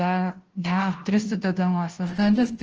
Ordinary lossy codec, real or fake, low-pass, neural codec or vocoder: Opus, 16 kbps; fake; 7.2 kHz; codec, 16 kHz, 0.5 kbps, FunCodec, trained on Chinese and English, 25 frames a second